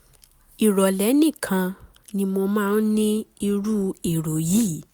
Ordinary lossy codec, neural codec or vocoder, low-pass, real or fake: none; none; none; real